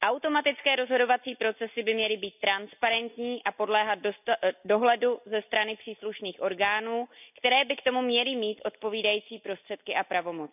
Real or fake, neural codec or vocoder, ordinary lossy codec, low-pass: real; none; none; 3.6 kHz